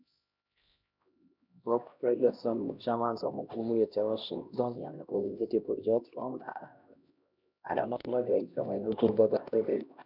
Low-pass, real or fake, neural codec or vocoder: 5.4 kHz; fake; codec, 16 kHz, 1 kbps, X-Codec, HuBERT features, trained on LibriSpeech